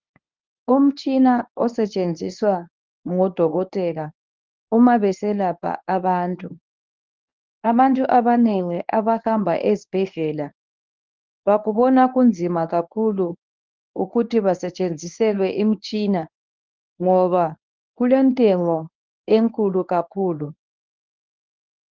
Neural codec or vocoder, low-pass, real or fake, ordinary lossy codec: codec, 24 kHz, 0.9 kbps, WavTokenizer, medium speech release version 1; 7.2 kHz; fake; Opus, 32 kbps